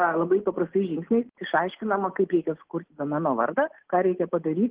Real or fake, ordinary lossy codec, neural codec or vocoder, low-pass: real; Opus, 16 kbps; none; 3.6 kHz